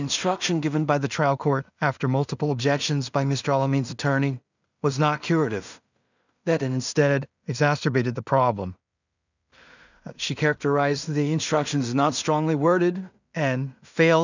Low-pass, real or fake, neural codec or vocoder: 7.2 kHz; fake; codec, 16 kHz in and 24 kHz out, 0.4 kbps, LongCat-Audio-Codec, two codebook decoder